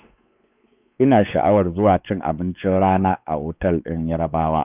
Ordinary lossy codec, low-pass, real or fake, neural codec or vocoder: none; 3.6 kHz; fake; codec, 16 kHz, 4 kbps, X-Codec, WavLM features, trained on Multilingual LibriSpeech